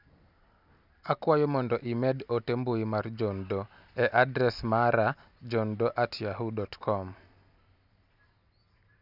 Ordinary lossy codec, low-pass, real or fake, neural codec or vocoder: none; 5.4 kHz; real; none